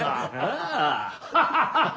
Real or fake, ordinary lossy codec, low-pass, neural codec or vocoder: real; none; none; none